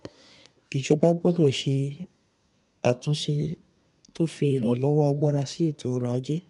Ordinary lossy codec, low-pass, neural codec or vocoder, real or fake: MP3, 96 kbps; 10.8 kHz; codec, 24 kHz, 1 kbps, SNAC; fake